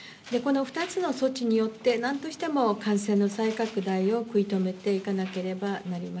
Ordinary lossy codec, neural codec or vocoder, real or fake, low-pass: none; none; real; none